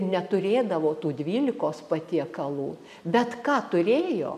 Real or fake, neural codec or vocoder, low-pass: real; none; 14.4 kHz